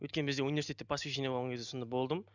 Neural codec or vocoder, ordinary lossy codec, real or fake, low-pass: none; none; real; 7.2 kHz